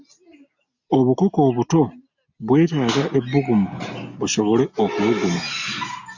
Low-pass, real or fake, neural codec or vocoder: 7.2 kHz; real; none